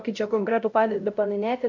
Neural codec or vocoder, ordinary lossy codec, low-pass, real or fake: codec, 16 kHz, 0.5 kbps, X-Codec, HuBERT features, trained on LibriSpeech; MP3, 64 kbps; 7.2 kHz; fake